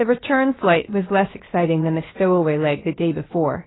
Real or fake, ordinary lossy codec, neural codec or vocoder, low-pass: fake; AAC, 16 kbps; codec, 16 kHz, about 1 kbps, DyCAST, with the encoder's durations; 7.2 kHz